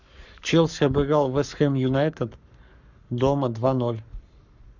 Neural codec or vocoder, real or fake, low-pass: codec, 44.1 kHz, 7.8 kbps, Pupu-Codec; fake; 7.2 kHz